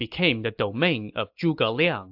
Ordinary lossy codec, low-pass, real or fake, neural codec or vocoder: AAC, 48 kbps; 5.4 kHz; real; none